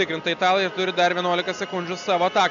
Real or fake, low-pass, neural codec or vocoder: real; 7.2 kHz; none